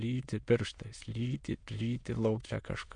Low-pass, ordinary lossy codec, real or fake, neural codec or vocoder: 9.9 kHz; MP3, 64 kbps; fake; autoencoder, 22.05 kHz, a latent of 192 numbers a frame, VITS, trained on many speakers